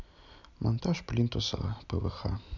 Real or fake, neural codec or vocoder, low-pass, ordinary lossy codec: real; none; 7.2 kHz; none